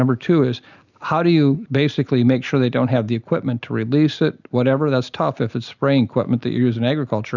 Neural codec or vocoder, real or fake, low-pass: none; real; 7.2 kHz